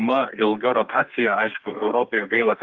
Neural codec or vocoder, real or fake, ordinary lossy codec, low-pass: codec, 32 kHz, 1.9 kbps, SNAC; fake; Opus, 32 kbps; 7.2 kHz